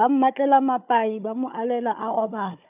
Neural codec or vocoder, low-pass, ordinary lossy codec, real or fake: codec, 16 kHz, 16 kbps, FunCodec, trained on Chinese and English, 50 frames a second; 3.6 kHz; none; fake